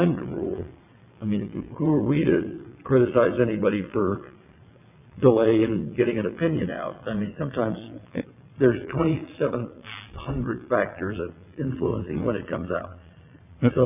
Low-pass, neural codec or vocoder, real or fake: 3.6 kHz; vocoder, 22.05 kHz, 80 mel bands, WaveNeXt; fake